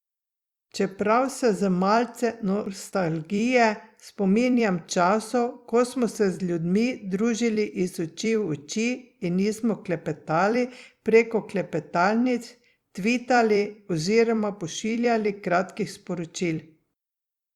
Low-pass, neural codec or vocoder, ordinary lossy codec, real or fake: 19.8 kHz; vocoder, 44.1 kHz, 128 mel bands every 256 samples, BigVGAN v2; Opus, 64 kbps; fake